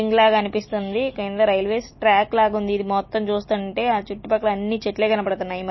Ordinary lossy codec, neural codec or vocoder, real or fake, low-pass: MP3, 24 kbps; none; real; 7.2 kHz